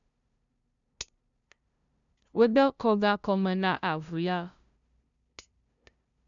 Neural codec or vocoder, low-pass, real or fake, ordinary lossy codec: codec, 16 kHz, 0.5 kbps, FunCodec, trained on LibriTTS, 25 frames a second; 7.2 kHz; fake; none